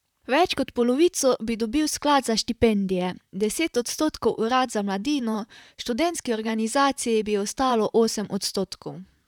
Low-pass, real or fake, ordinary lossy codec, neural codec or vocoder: 19.8 kHz; fake; none; vocoder, 44.1 kHz, 128 mel bands, Pupu-Vocoder